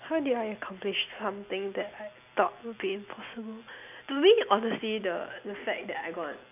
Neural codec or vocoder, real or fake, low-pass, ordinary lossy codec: none; real; 3.6 kHz; AAC, 32 kbps